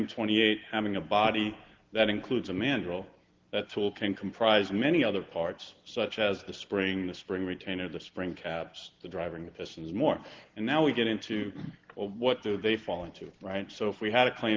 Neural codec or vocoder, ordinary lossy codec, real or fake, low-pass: none; Opus, 16 kbps; real; 7.2 kHz